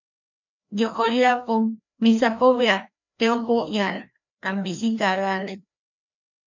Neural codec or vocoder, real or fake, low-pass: codec, 16 kHz, 1 kbps, FreqCodec, larger model; fake; 7.2 kHz